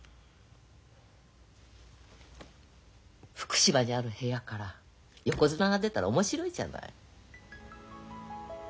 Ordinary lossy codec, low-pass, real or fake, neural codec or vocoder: none; none; real; none